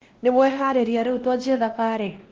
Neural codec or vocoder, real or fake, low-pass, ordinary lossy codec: codec, 16 kHz, 1 kbps, X-Codec, WavLM features, trained on Multilingual LibriSpeech; fake; 7.2 kHz; Opus, 16 kbps